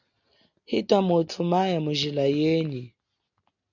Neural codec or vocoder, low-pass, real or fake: none; 7.2 kHz; real